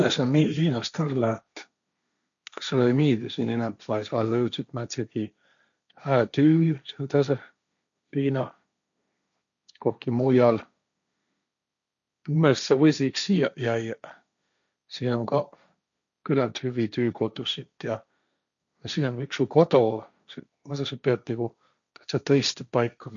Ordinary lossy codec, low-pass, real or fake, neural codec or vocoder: none; 7.2 kHz; fake; codec, 16 kHz, 1.1 kbps, Voila-Tokenizer